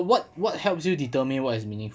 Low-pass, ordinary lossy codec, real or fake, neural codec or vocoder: none; none; real; none